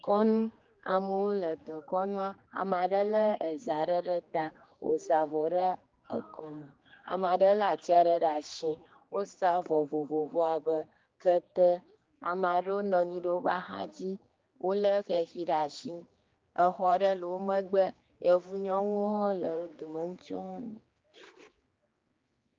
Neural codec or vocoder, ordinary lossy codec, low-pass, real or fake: codec, 16 kHz, 2 kbps, X-Codec, HuBERT features, trained on general audio; Opus, 24 kbps; 7.2 kHz; fake